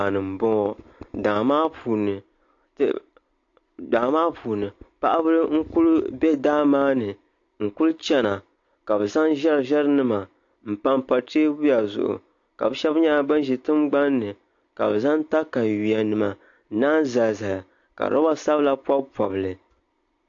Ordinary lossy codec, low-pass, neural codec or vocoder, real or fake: AAC, 48 kbps; 7.2 kHz; none; real